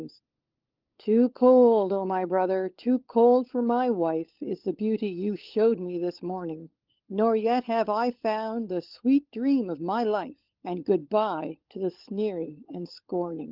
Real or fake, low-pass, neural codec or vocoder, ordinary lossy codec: fake; 5.4 kHz; codec, 16 kHz, 16 kbps, FunCodec, trained on LibriTTS, 50 frames a second; Opus, 32 kbps